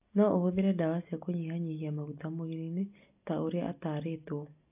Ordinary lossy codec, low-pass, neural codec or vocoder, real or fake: AAC, 32 kbps; 3.6 kHz; none; real